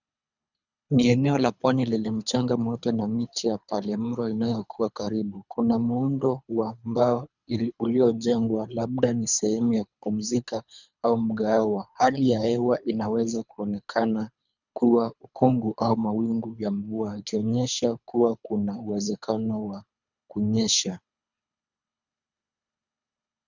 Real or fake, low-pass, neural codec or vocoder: fake; 7.2 kHz; codec, 24 kHz, 3 kbps, HILCodec